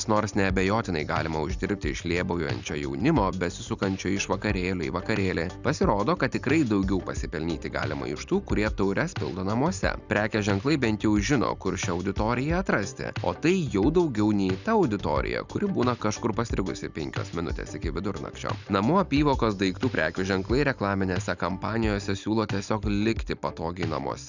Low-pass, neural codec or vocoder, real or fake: 7.2 kHz; none; real